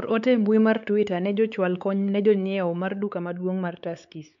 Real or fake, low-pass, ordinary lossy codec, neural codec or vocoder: fake; 7.2 kHz; none; codec, 16 kHz, 4 kbps, X-Codec, WavLM features, trained on Multilingual LibriSpeech